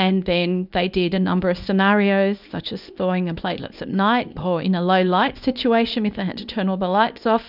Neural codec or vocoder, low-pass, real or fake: codec, 24 kHz, 0.9 kbps, WavTokenizer, small release; 5.4 kHz; fake